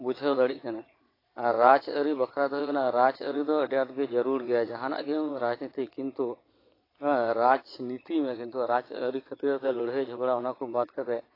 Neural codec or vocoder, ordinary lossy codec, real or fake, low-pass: vocoder, 22.05 kHz, 80 mel bands, Vocos; AAC, 24 kbps; fake; 5.4 kHz